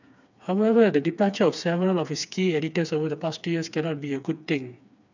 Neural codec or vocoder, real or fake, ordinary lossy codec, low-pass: codec, 16 kHz, 4 kbps, FreqCodec, smaller model; fake; none; 7.2 kHz